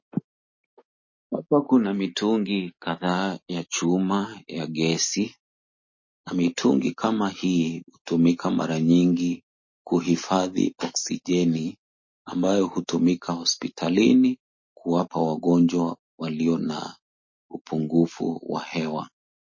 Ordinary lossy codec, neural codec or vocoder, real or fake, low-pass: MP3, 32 kbps; none; real; 7.2 kHz